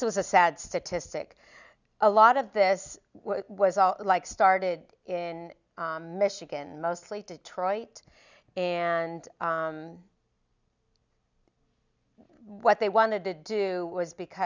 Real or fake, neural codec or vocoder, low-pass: real; none; 7.2 kHz